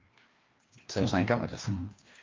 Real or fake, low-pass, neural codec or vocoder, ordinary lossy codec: fake; 7.2 kHz; codec, 16 kHz, 2 kbps, FreqCodec, larger model; Opus, 32 kbps